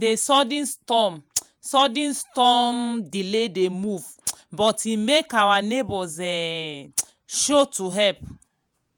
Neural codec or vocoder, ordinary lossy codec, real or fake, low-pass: vocoder, 48 kHz, 128 mel bands, Vocos; none; fake; none